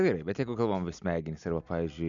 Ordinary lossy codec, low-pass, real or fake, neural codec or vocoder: MP3, 96 kbps; 7.2 kHz; real; none